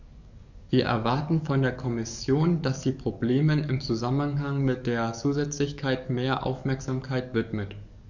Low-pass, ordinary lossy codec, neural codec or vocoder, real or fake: 7.2 kHz; none; codec, 44.1 kHz, 7.8 kbps, DAC; fake